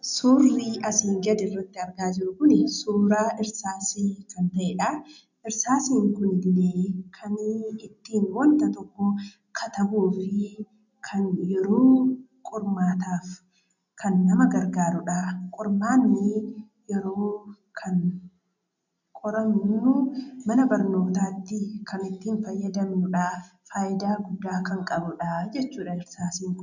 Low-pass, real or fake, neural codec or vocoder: 7.2 kHz; real; none